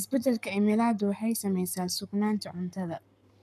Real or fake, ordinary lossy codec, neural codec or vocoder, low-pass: fake; none; codec, 44.1 kHz, 7.8 kbps, Pupu-Codec; 14.4 kHz